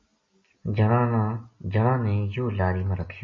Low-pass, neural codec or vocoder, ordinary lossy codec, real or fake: 7.2 kHz; none; MP3, 32 kbps; real